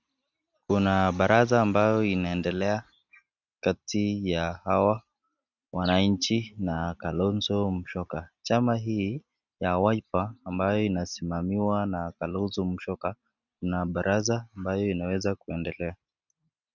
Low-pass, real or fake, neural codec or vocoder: 7.2 kHz; real; none